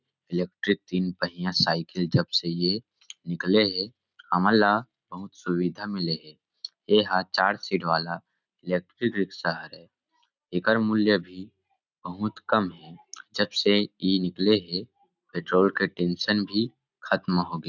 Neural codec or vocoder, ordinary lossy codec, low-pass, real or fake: none; none; 7.2 kHz; real